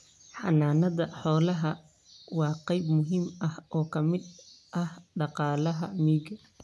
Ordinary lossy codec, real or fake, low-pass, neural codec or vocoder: none; real; none; none